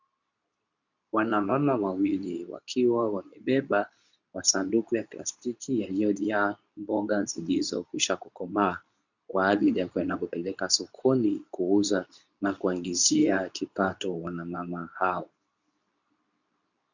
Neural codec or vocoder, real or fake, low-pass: codec, 24 kHz, 0.9 kbps, WavTokenizer, medium speech release version 2; fake; 7.2 kHz